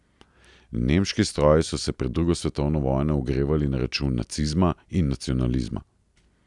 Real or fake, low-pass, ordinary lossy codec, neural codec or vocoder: real; 10.8 kHz; none; none